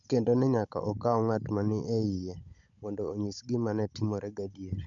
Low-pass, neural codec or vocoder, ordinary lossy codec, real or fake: 7.2 kHz; codec, 16 kHz, 16 kbps, FunCodec, trained on Chinese and English, 50 frames a second; none; fake